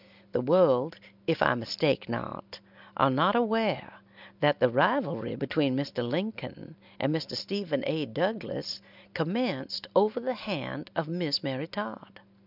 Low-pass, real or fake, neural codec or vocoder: 5.4 kHz; real; none